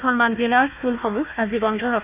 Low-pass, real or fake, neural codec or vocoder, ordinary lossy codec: 3.6 kHz; fake; codec, 16 kHz, 1 kbps, FunCodec, trained on Chinese and English, 50 frames a second; none